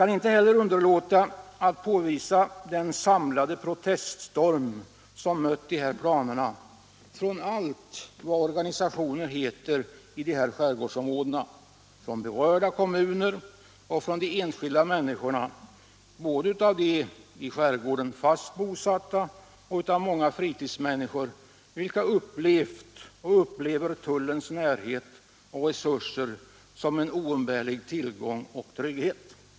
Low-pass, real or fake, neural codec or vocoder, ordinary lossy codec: none; real; none; none